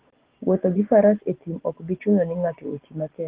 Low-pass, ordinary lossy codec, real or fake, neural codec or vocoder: 3.6 kHz; Opus, 16 kbps; real; none